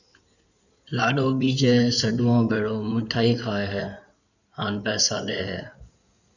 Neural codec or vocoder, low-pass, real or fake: codec, 16 kHz in and 24 kHz out, 2.2 kbps, FireRedTTS-2 codec; 7.2 kHz; fake